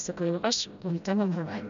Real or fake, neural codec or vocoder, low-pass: fake; codec, 16 kHz, 0.5 kbps, FreqCodec, smaller model; 7.2 kHz